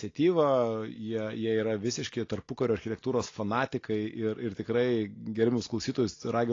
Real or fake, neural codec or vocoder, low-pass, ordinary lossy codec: real; none; 7.2 kHz; AAC, 32 kbps